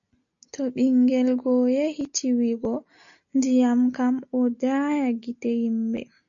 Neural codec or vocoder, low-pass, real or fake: none; 7.2 kHz; real